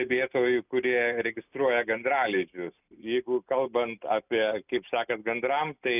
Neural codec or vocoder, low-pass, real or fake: none; 3.6 kHz; real